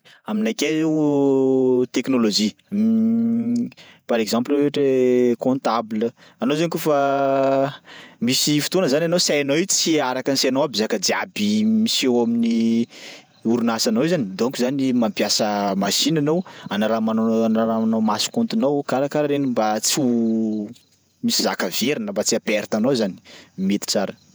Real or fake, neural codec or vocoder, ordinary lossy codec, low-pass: fake; vocoder, 48 kHz, 128 mel bands, Vocos; none; none